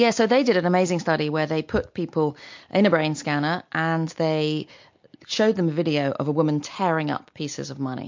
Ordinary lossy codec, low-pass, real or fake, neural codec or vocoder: MP3, 48 kbps; 7.2 kHz; real; none